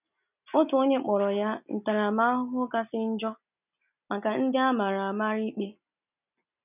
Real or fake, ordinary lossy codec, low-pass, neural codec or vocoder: real; none; 3.6 kHz; none